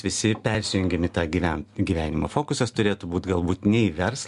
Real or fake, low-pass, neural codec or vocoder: real; 10.8 kHz; none